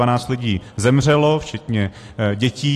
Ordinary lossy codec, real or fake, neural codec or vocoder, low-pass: AAC, 48 kbps; real; none; 14.4 kHz